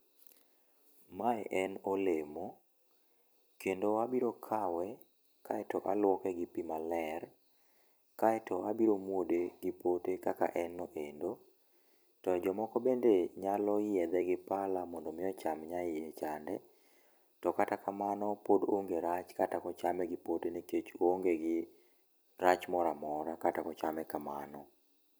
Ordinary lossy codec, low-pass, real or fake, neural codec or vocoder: none; none; fake; vocoder, 44.1 kHz, 128 mel bands every 256 samples, BigVGAN v2